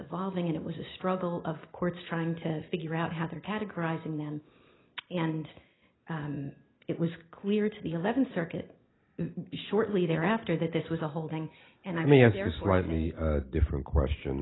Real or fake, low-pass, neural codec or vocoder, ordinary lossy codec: real; 7.2 kHz; none; AAC, 16 kbps